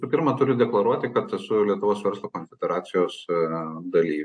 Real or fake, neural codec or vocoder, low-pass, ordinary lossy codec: real; none; 9.9 kHz; AAC, 64 kbps